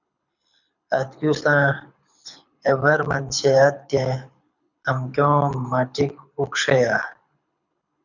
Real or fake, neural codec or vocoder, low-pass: fake; codec, 24 kHz, 6 kbps, HILCodec; 7.2 kHz